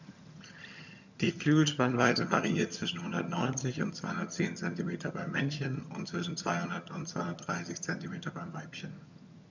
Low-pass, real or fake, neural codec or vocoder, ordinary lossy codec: 7.2 kHz; fake; vocoder, 22.05 kHz, 80 mel bands, HiFi-GAN; none